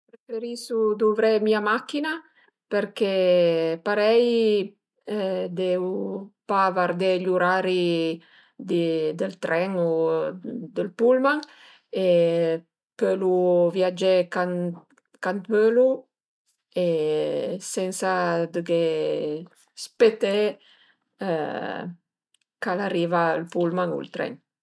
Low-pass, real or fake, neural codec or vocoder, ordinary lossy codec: none; real; none; none